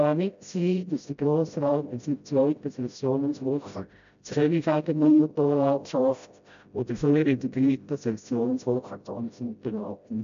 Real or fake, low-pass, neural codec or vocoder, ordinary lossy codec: fake; 7.2 kHz; codec, 16 kHz, 0.5 kbps, FreqCodec, smaller model; MP3, 64 kbps